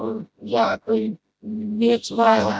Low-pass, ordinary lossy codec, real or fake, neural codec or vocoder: none; none; fake; codec, 16 kHz, 0.5 kbps, FreqCodec, smaller model